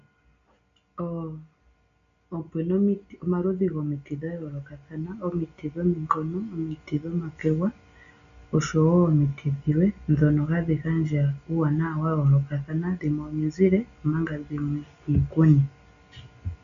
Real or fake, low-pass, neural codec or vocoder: real; 7.2 kHz; none